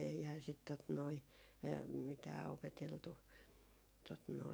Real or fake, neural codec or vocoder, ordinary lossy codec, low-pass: fake; codec, 44.1 kHz, 7.8 kbps, DAC; none; none